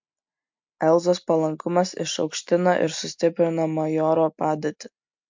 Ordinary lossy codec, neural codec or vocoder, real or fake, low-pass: MP3, 64 kbps; none; real; 7.2 kHz